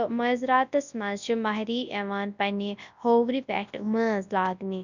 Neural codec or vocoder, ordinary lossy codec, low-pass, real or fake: codec, 24 kHz, 0.9 kbps, WavTokenizer, large speech release; none; 7.2 kHz; fake